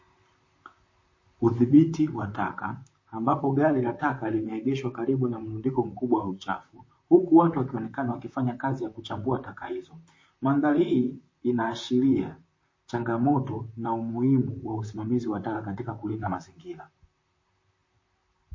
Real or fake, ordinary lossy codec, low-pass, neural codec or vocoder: fake; MP3, 32 kbps; 7.2 kHz; vocoder, 44.1 kHz, 128 mel bands, Pupu-Vocoder